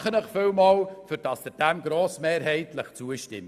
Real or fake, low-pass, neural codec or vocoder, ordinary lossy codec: real; 14.4 kHz; none; none